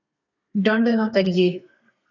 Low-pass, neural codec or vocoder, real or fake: 7.2 kHz; codec, 32 kHz, 1.9 kbps, SNAC; fake